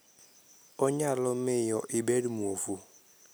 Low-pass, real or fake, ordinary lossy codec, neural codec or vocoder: none; real; none; none